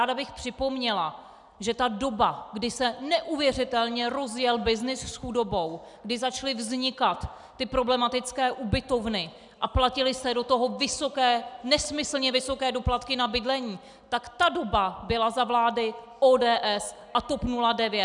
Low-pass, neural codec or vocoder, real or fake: 10.8 kHz; none; real